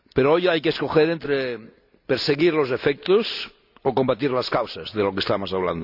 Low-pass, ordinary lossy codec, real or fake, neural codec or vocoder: 5.4 kHz; none; real; none